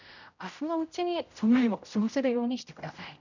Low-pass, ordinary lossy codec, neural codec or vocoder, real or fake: 7.2 kHz; none; codec, 16 kHz, 0.5 kbps, X-Codec, HuBERT features, trained on general audio; fake